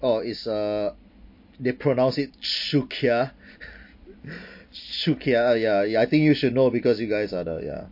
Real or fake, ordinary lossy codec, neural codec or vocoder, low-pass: real; MP3, 32 kbps; none; 5.4 kHz